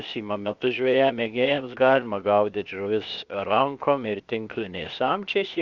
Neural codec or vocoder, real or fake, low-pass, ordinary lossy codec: codec, 16 kHz, 0.8 kbps, ZipCodec; fake; 7.2 kHz; Opus, 64 kbps